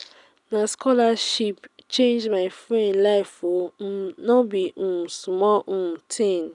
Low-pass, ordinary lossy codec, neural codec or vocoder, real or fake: 10.8 kHz; none; none; real